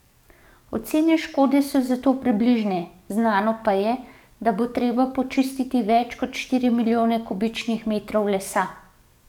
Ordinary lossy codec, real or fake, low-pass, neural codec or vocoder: none; fake; 19.8 kHz; codec, 44.1 kHz, 7.8 kbps, DAC